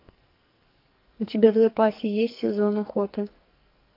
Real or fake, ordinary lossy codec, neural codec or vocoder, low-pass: fake; AAC, 32 kbps; codec, 44.1 kHz, 2.6 kbps, SNAC; 5.4 kHz